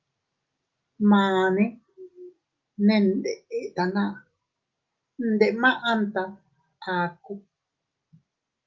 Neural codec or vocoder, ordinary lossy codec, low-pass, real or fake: none; Opus, 32 kbps; 7.2 kHz; real